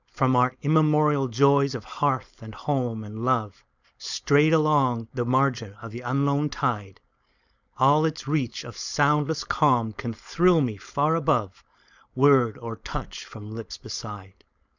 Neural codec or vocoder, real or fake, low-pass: codec, 16 kHz, 4.8 kbps, FACodec; fake; 7.2 kHz